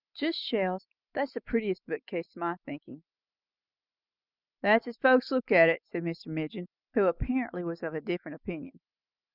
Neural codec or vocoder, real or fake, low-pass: none; real; 5.4 kHz